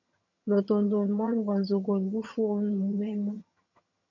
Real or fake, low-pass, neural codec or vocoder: fake; 7.2 kHz; vocoder, 22.05 kHz, 80 mel bands, HiFi-GAN